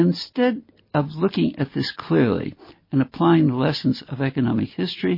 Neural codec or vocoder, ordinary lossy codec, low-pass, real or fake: none; MP3, 24 kbps; 5.4 kHz; real